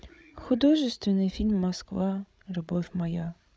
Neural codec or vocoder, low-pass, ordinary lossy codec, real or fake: codec, 16 kHz, 16 kbps, FreqCodec, larger model; none; none; fake